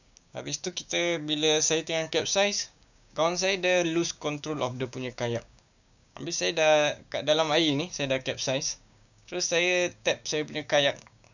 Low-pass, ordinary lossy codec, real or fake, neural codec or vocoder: 7.2 kHz; none; fake; codec, 16 kHz, 6 kbps, DAC